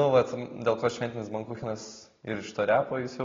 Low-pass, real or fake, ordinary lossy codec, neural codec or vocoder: 7.2 kHz; real; AAC, 32 kbps; none